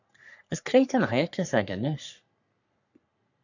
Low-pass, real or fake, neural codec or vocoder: 7.2 kHz; fake; codec, 44.1 kHz, 3.4 kbps, Pupu-Codec